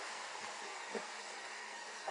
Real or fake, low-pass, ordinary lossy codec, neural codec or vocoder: fake; 10.8 kHz; AAC, 32 kbps; codec, 44.1 kHz, 2.6 kbps, SNAC